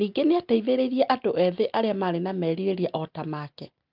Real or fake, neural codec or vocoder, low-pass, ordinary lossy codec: real; none; 5.4 kHz; Opus, 32 kbps